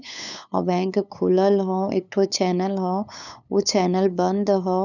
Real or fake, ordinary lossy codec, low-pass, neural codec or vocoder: fake; none; 7.2 kHz; codec, 16 kHz, 8 kbps, FunCodec, trained on LibriTTS, 25 frames a second